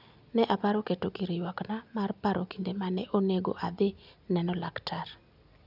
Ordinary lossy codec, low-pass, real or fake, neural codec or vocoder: none; 5.4 kHz; real; none